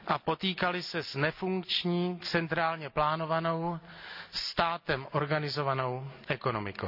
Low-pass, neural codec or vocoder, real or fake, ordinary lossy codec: 5.4 kHz; none; real; AAC, 48 kbps